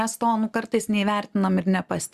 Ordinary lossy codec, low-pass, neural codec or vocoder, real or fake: Opus, 64 kbps; 14.4 kHz; none; real